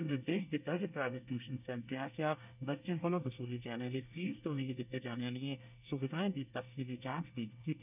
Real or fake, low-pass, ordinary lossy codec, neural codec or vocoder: fake; 3.6 kHz; none; codec, 24 kHz, 1 kbps, SNAC